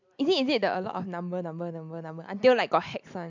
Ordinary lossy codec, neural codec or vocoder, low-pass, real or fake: MP3, 64 kbps; none; 7.2 kHz; real